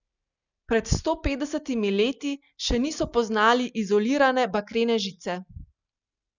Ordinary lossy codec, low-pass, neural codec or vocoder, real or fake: none; 7.2 kHz; none; real